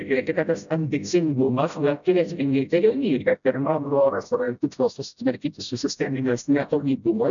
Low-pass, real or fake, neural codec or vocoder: 7.2 kHz; fake; codec, 16 kHz, 0.5 kbps, FreqCodec, smaller model